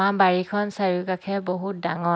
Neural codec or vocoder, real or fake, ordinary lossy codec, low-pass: none; real; none; none